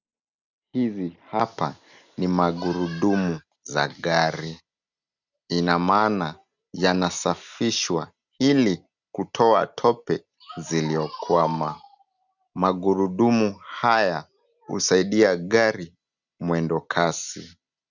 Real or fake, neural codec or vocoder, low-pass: real; none; 7.2 kHz